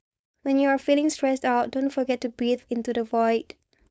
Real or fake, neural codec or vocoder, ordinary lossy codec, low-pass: fake; codec, 16 kHz, 4.8 kbps, FACodec; none; none